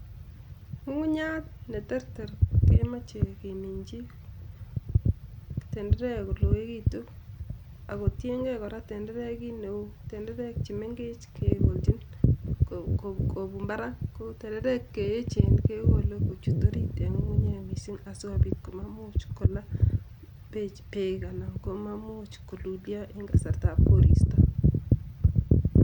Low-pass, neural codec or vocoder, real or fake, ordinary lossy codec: 19.8 kHz; none; real; none